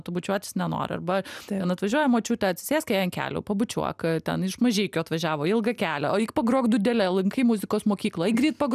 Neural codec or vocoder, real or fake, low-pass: none; real; 14.4 kHz